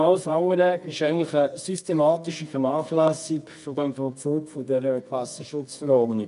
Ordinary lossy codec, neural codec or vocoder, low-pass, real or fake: none; codec, 24 kHz, 0.9 kbps, WavTokenizer, medium music audio release; 10.8 kHz; fake